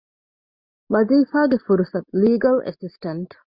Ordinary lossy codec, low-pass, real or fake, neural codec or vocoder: Opus, 64 kbps; 5.4 kHz; real; none